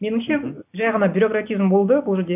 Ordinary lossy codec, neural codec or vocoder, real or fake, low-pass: AAC, 32 kbps; none; real; 3.6 kHz